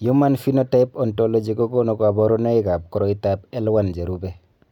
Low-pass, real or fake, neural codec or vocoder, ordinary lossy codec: 19.8 kHz; real; none; none